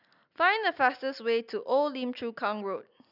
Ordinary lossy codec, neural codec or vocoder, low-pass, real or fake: none; none; 5.4 kHz; real